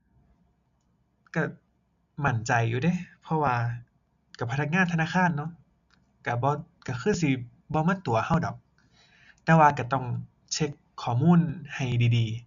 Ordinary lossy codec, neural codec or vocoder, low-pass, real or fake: none; none; 7.2 kHz; real